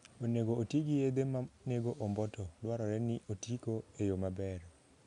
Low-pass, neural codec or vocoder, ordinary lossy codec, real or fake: 10.8 kHz; none; none; real